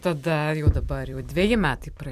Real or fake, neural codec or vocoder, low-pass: fake; vocoder, 44.1 kHz, 128 mel bands every 256 samples, BigVGAN v2; 14.4 kHz